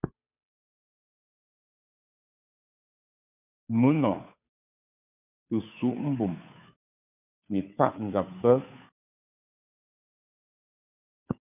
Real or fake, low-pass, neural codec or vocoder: fake; 3.6 kHz; codec, 16 kHz, 2 kbps, FunCodec, trained on Chinese and English, 25 frames a second